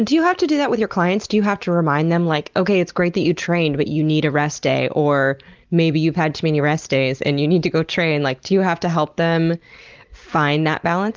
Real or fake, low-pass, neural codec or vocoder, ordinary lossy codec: real; 7.2 kHz; none; Opus, 32 kbps